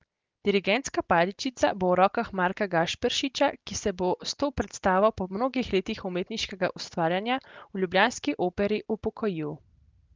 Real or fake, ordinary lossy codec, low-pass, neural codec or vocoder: real; Opus, 32 kbps; 7.2 kHz; none